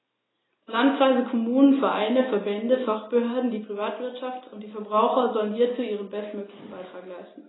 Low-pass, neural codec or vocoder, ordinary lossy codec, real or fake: 7.2 kHz; none; AAC, 16 kbps; real